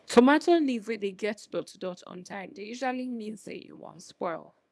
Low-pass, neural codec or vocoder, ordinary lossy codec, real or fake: none; codec, 24 kHz, 0.9 kbps, WavTokenizer, small release; none; fake